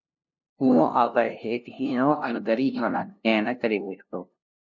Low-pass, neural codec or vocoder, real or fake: 7.2 kHz; codec, 16 kHz, 0.5 kbps, FunCodec, trained on LibriTTS, 25 frames a second; fake